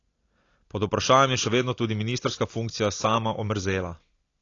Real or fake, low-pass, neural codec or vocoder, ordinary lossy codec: real; 7.2 kHz; none; AAC, 32 kbps